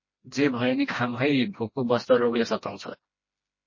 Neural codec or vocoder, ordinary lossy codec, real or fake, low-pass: codec, 16 kHz, 1 kbps, FreqCodec, smaller model; MP3, 32 kbps; fake; 7.2 kHz